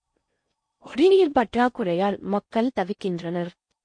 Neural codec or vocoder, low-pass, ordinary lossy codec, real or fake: codec, 16 kHz in and 24 kHz out, 0.6 kbps, FocalCodec, streaming, 4096 codes; 9.9 kHz; MP3, 48 kbps; fake